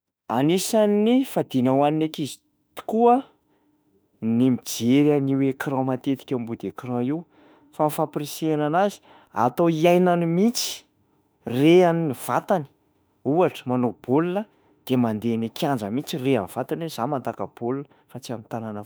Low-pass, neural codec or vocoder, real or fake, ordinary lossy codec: none; autoencoder, 48 kHz, 32 numbers a frame, DAC-VAE, trained on Japanese speech; fake; none